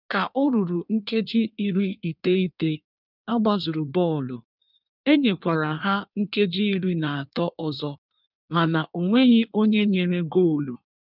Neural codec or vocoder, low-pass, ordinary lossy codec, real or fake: codec, 16 kHz in and 24 kHz out, 1.1 kbps, FireRedTTS-2 codec; 5.4 kHz; none; fake